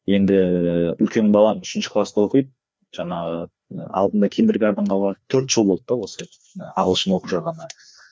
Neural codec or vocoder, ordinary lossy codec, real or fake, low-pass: codec, 16 kHz, 2 kbps, FreqCodec, larger model; none; fake; none